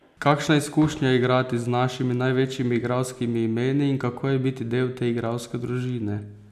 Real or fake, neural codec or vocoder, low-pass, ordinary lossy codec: real; none; 14.4 kHz; none